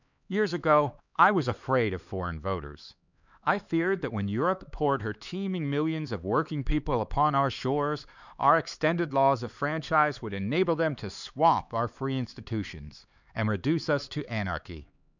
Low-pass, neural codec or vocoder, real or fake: 7.2 kHz; codec, 16 kHz, 4 kbps, X-Codec, HuBERT features, trained on LibriSpeech; fake